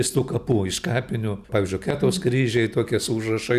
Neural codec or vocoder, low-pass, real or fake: none; 14.4 kHz; real